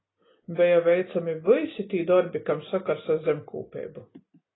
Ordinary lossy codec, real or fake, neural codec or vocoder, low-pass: AAC, 16 kbps; real; none; 7.2 kHz